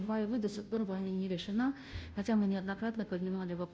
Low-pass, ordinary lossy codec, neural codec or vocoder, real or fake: none; none; codec, 16 kHz, 0.5 kbps, FunCodec, trained on Chinese and English, 25 frames a second; fake